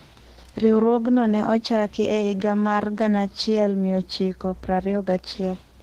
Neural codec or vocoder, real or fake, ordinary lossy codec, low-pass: codec, 32 kHz, 1.9 kbps, SNAC; fake; Opus, 16 kbps; 14.4 kHz